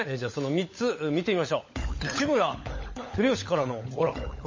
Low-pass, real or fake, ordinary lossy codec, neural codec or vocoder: 7.2 kHz; fake; MP3, 32 kbps; codec, 16 kHz, 16 kbps, FunCodec, trained on LibriTTS, 50 frames a second